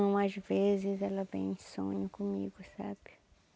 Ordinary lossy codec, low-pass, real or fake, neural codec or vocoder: none; none; real; none